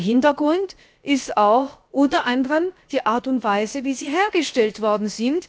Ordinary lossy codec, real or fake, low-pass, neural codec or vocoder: none; fake; none; codec, 16 kHz, about 1 kbps, DyCAST, with the encoder's durations